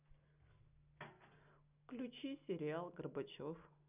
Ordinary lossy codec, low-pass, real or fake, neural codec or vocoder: none; 3.6 kHz; real; none